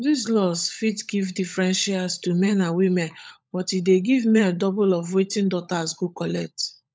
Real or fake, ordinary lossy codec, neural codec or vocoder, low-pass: fake; none; codec, 16 kHz, 16 kbps, FunCodec, trained on LibriTTS, 50 frames a second; none